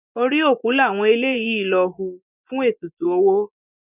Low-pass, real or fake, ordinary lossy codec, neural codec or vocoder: 3.6 kHz; fake; none; vocoder, 24 kHz, 100 mel bands, Vocos